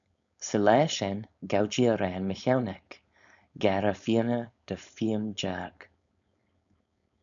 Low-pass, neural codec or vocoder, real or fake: 7.2 kHz; codec, 16 kHz, 4.8 kbps, FACodec; fake